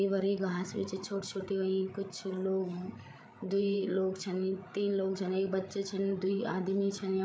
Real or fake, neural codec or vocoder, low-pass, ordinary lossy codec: fake; codec, 16 kHz, 16 kbps, FreqCodec, larger model; none; none